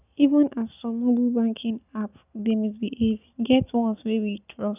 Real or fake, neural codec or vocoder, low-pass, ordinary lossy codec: real; none; 3.6 kHz; none